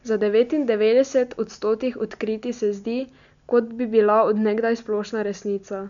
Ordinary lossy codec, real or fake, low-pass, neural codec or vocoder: none; real; 7.2 kHz; none